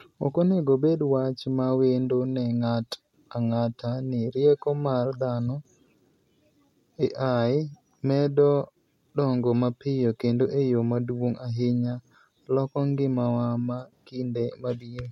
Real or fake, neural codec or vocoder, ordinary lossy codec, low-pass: real; none; MP3, 64 kbps; 19.8 kHz